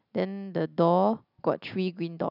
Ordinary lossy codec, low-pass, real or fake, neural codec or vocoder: none; 5.4 kHz; real; none